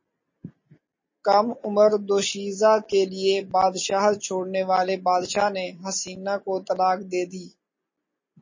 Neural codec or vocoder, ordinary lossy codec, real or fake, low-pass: none; MP3, 32 kbps; real; 7.2 kHz